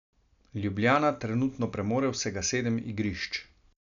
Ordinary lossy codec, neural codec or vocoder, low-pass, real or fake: MP3, 96 kbps; none; 7.2 kHz; real